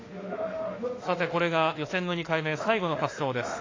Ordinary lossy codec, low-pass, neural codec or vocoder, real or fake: AAC, 32 kbps; 7.2 kHz; autoencoder, 48 kHz, 32 numbers a frame, DAC-VAE, trained on Japanese speech; fake